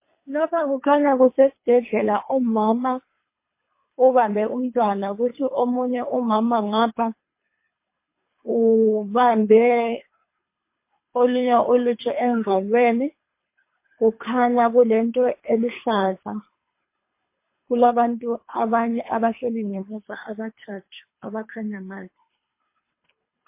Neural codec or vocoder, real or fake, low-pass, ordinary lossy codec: codec, 24 kHz, 3 kbps, HILCodec; fake; 3.6 kHz; MP3, 24 kbps